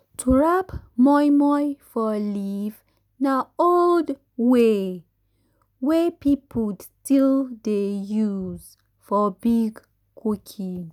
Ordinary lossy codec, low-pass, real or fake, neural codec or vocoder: none; 19.8 kHz; real; none